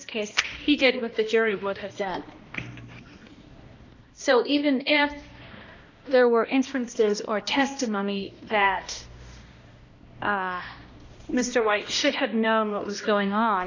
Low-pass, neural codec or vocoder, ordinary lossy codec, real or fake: 7.2 kHz; codec, 16 kHz, 1 kbps, X-Codec, HuBERT features, trained on balanced general audio; MP3, 64 kbps; fake